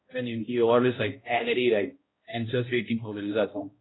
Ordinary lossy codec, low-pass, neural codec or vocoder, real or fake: AAC, 16 kbps; 7.2 kHz; codec, 16 kHz, 0.5 kbps, X-Codec, HuBERT features, trained on balanced general audio; fake